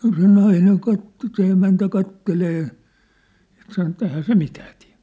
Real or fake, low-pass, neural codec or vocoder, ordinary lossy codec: real; none; none; none